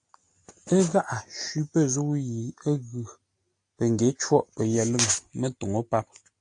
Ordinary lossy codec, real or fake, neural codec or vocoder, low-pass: AAC, 64 kbps; real; none; 9.9 kHz